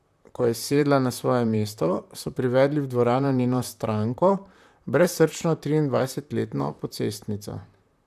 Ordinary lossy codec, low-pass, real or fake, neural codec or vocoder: none; 14.4 kHz; fake; vocoder, 44.1 kHz, 128 mel bands, Pupu-Vocoder